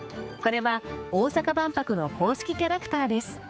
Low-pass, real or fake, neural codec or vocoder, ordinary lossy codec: none; fake; codec, 16 kHz, 4 kbps, X-Codec, HuBERT features, trained on general audio; none